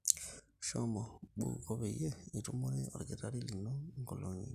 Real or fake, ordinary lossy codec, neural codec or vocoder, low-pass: real; Opus, 64 kbps; none; 14.4 kHz